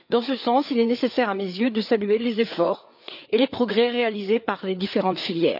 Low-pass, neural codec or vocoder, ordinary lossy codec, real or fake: 5.4 kHz; codec, 16 kHz, 4 kbps, FreqCodec, larger model; none; fake